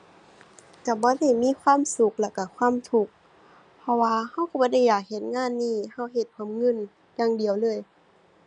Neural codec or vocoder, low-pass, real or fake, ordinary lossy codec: none; 9.9 kHz; real; none